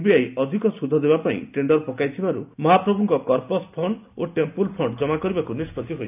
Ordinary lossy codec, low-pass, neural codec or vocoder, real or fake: none; 3.6 kHz; none; real